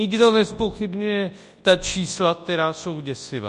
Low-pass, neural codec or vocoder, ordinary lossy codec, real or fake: 10.8 kHz; codec, 24 kHz, 0.9 kbps, WavTokenizer, large speech release; MP3, 48 kbps; fake